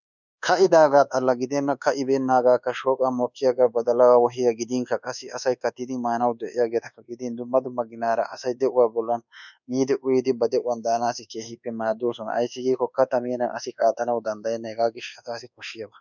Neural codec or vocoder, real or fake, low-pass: codec, 24 kHz, 1.2 kbps, DualCodec; fake; 7.2 kHz